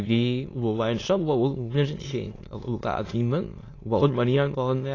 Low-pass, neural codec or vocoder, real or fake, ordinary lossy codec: 7.2 kHz; autoencoder, 22.05 kHz, a latent of 192 numbers a frame, VITS, trained on many speakers; fake; AAC, 32 kbps